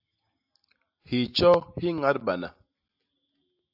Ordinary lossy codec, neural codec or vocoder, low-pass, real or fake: AAC, 48 kbps; none; 5.4 kHz; real